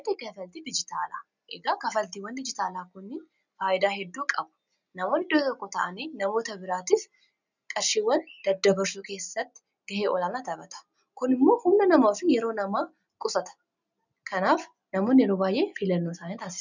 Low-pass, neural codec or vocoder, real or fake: 7.2 kHz; none; real